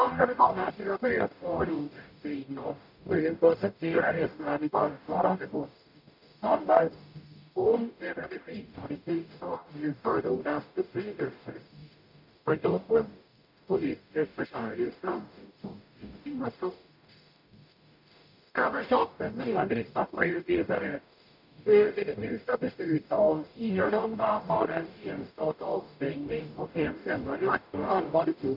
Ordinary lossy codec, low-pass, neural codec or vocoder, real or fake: none; 5.4 kHz; codec, 44.1 kHz, 0.9 kbps, DAC; fake